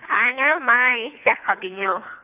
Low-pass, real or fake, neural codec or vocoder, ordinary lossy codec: 3.6 kHz; fake; codec, 24 kHz, 3 kbps, HILCodec; none